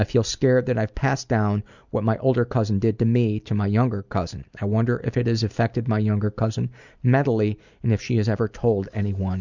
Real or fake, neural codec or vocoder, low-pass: real; none; 7.2 kHz